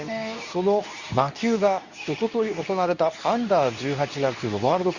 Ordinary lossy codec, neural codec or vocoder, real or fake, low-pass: Opus, 64 kbps; codec, 24 kHz, 0.9 kbps, WavTokenizer, medium speech release version 2; fake; 7.2 kHz